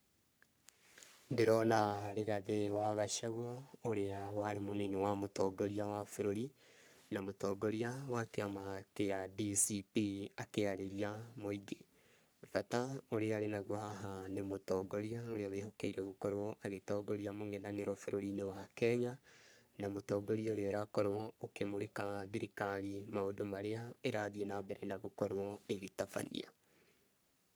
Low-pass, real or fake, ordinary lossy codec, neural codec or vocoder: none; fake; none; codec, 44.1 kHz, 3.4 kbps, Pupu-Codec